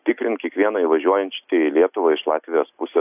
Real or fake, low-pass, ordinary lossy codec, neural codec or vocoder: real; 3.6 kHz; AAC, 32 kbps; none